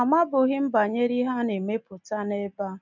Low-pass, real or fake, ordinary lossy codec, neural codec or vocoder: 7.2 kHz; real; none; none